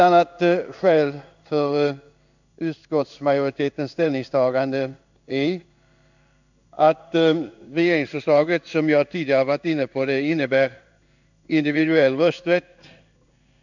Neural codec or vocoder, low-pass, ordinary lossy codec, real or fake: codec, 16 kHz in and 24 kHz out, 1 kbps, XY-Tokenizer; 7.2 kHz; none; fake